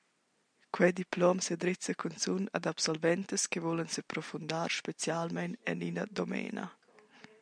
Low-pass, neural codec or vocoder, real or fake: 9.9 kHz; none; real